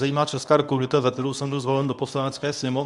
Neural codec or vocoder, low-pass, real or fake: codec, 24 kHz, 0.9 kbps, WavTokenizer, medium speech release version 1; 10.8 kHz; fake